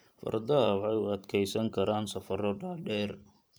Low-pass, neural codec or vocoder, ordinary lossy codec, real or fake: none; vocoder, 44.1 kHz, 128 mel bands every 512 samples, BigVGAN v2; none; fake